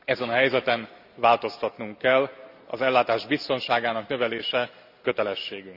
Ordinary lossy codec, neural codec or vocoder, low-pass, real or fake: none; none; 5.4 kHz; real